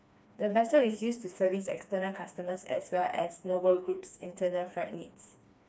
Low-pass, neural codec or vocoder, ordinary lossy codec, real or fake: none; codec, 16 kHz, 2 kbps, FreqCodec, smaller model; none; fake